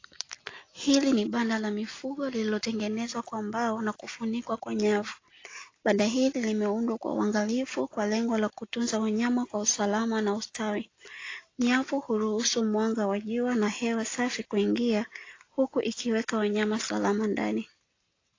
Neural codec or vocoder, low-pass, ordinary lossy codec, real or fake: none; 7.2 kHz; AAC, 32 kbps; real